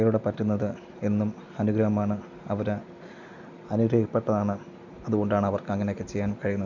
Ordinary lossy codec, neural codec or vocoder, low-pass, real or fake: none; none; 7.2 kHz; real